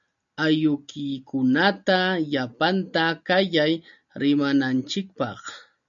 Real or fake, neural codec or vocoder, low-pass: real; none; 7.2 kHz